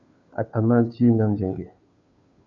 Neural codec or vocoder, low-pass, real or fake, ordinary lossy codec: codec, 16 kHz, 2 kbps, FunCodec, trained on Chinese and English, 25 frames a second; 7.2 kHz; fake; MP3, 96 kbps